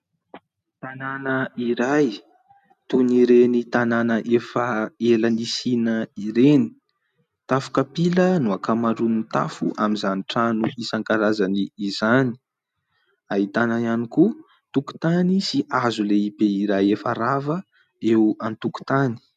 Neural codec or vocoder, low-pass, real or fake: none; 14.4 kHz; real